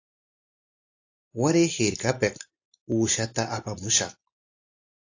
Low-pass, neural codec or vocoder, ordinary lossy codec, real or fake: 7.2 kHz; none; AAC, 48 kbps; real